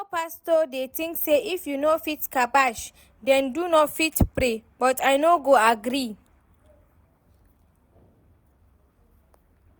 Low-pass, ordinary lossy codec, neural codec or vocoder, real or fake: none; none; none; real